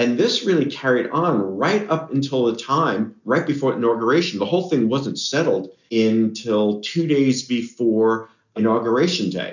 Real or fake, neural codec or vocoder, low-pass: real; none; 7.2 kHz